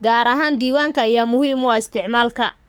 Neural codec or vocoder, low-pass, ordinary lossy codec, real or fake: codec, 44.1 kHz, 3.4 kbps, Pupu-Codec; none; none; fake